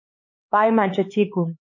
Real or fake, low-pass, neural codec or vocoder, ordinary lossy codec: fake; 7.2 kHz; codec, 16 kHz, 4 kbps, X-Codec, HuBERT features, trained on LibriSpeech; MP3, 32 kbps